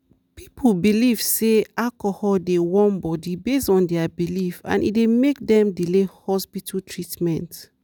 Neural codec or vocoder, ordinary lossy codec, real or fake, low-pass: none; none; real; 19.8 kHz